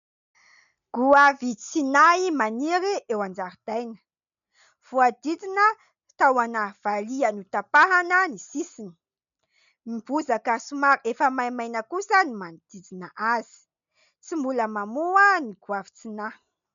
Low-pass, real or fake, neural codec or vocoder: 7.2 kHz; real; none